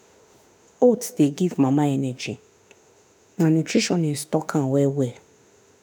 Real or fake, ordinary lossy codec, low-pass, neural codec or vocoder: fake; none; none; autoencoder, 48 kHz, 32 numbers a frame, DAC-VAE, trained on Japanese speech